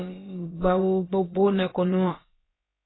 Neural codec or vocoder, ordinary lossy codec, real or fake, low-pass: codec, 16 kHz, about 1 kbps, DyCAST, with the encoder's durations; AAC, 16 kbps; fake; 7.2 kHz